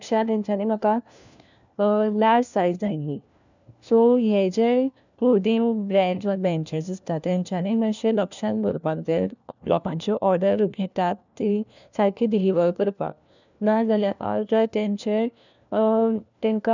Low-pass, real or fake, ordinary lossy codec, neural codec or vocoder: 7.2 kHz; fake; none; codec, 16 kHz, 1 kbps, FunCodec, trained on LibriTTS, 50 frames a second